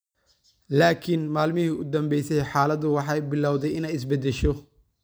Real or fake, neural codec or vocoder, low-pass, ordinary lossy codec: real; none; none; none